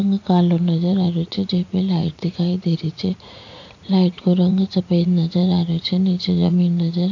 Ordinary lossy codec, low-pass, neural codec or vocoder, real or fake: AAC, 48 kbps; 7.2 kHz; vocoder, 44.1 kHz, 80 mel bands, Vocos; fake